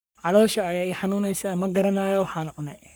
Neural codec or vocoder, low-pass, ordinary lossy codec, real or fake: codec, 44.1 kHz, 3.4 kbps, Pupu-Codec; none; none; fake